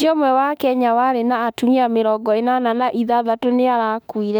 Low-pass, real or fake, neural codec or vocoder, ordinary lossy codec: 19.8 kHz; fake; autoencoder, 48 kHz, 32 numbers a frame, DAC-VAE, trained on Japanese speech; none